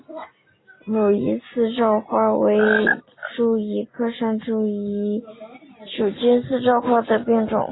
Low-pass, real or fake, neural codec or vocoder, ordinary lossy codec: 7.2 kHz; real; none; AAC, 16 kbps